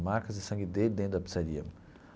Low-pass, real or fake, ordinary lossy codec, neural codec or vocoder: none; real; none; none